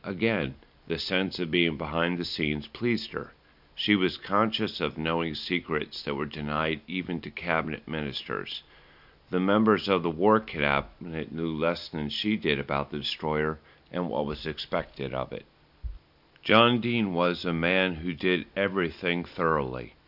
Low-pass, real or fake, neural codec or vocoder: 5.4 kHz; real; none